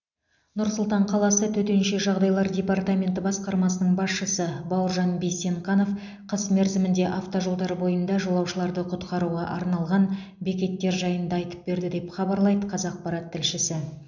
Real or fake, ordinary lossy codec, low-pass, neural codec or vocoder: real; none; 7.2 kHz; none